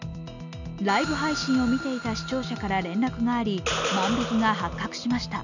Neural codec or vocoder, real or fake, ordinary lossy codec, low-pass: none; real; none; 7.2 kHz